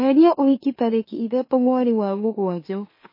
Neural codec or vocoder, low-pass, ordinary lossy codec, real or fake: autoencoder, 44.1 kHz, a latent of 192 numbers a frame, MeloTTS; 5.4 kHz; MP3, 24 kbps; fake